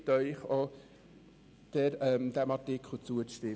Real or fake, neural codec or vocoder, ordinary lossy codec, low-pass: real; none; none; none